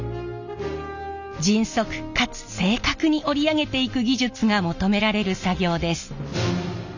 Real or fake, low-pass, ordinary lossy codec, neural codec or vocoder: real; 7.2 kHz; none; none